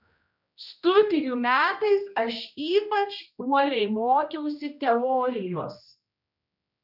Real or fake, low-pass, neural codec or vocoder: fake; 5.4 kHz; codec, 16 kHz, 1 kbps, X-Codec, HuBERT features, trained on general audio